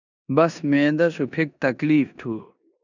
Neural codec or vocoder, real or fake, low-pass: codec, 16 kHz in and 24 kHz out, 0.9 kbps, LongCat-Audio-Codec, four codebook decoder; fake; 7.2 kHz